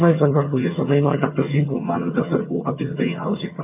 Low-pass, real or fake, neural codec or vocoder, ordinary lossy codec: 3.6 kHz; fake; vocoder, 22.05 kHz, 80 mel bands, HiFi-GAN; none